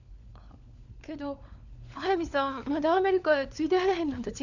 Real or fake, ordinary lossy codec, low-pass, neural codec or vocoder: fake; none; 7.2 kHz; codec, 16 kHz, 4 kbps, FunCodec, trained on LibriTTS, 50 frames a second